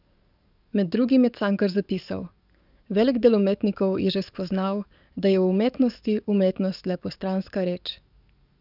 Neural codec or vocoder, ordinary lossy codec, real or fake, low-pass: codec, 44.1 kHz, 7.8 kbps, DAC; none; fake; 5.4 kHz